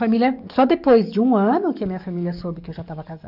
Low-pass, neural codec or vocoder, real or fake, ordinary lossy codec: 5.4 kHz; codec, 44.1 kHz, 7.8 kbps, DAC; fake; none